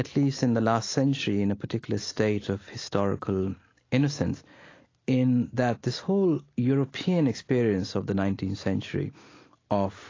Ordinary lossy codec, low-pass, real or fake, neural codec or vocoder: AAC, 32 kbps; 7.2 kHz; real; none